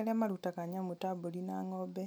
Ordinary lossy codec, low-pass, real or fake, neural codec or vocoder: none; none; real; none